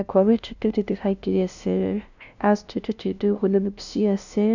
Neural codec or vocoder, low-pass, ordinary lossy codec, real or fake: codec, 16 kHz, 0.5 kbps, FunCodec, trained on LibriTTS, 25 frames a second; 7.2 kHz; none; fake